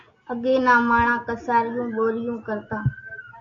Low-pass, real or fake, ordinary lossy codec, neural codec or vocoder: 7.2 kHz; real; AAC, 48 kbps; none